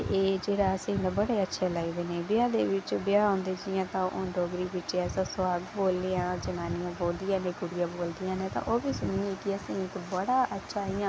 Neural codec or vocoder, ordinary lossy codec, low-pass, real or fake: none; none; none; real